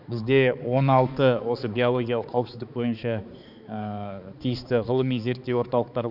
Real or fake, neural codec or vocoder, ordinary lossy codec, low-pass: fake; codec, 16 kHz, 4 kbps, X-Codec, HuBERT features, trained on balanced general audio; none; 5.4 kHz